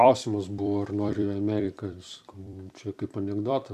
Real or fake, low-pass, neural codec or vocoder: fake; 14.4 kHz; vocoder, 44.1 kHz, 128 mel bands every 256 samples, BigVGAN v2